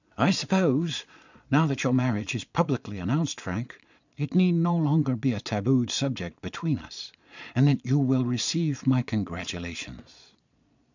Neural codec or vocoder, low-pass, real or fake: vocoder, 22.05 kHz, 80 mel bands, Vocos; 7.2 kHz; fake